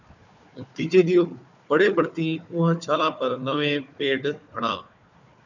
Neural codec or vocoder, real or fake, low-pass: codec, 16 kHz, 4 kbps, FunCodec, trained on Chinese and English, 50 frames a second; fake; 7.2 kHz